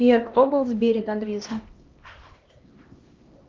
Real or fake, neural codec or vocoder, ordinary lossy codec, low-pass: fake; codec, 16 kHz, 1 kbps, X-Codec, HuBERT features, trained on LibriSpeech; Opus, 32 kbps; 7.2 kHz